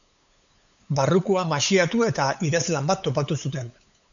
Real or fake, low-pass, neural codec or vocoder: fake; 7.2 kHz; codec, 16 kHz, 8 kbps, FunCodec, trained on LibriTTS, 25 frames a second